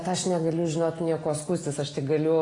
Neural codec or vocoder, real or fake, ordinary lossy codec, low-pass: none; real; AAC, 32 kbps; 10.8 kHz